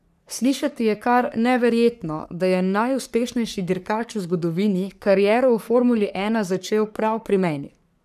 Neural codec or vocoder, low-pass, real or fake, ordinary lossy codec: codec, 44.1 kHz, 3.4 kbps, Pupu-Codec; 14.4 kHz; fake; none